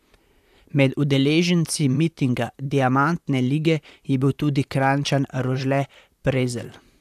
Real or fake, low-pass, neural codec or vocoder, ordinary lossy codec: fake; 14.4 kHz; vocoder, 44.1 kHz, 128 mel bands, Pupu-Vocoder; AAC, 96 kbps